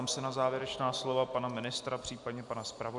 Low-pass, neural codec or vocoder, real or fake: 10.8 kHz; none; real